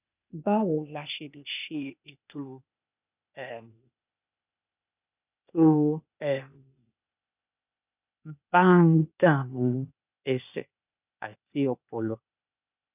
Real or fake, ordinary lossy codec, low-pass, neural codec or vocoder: fake; none; 3.6 kHz; codec, 16 kHz, 0.8 kbps, ZipCodec